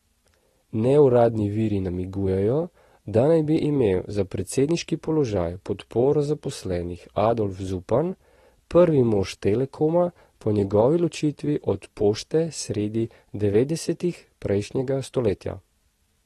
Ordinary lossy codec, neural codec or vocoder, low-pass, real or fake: AAC, 32 kbps; none; 19.8 kHz; real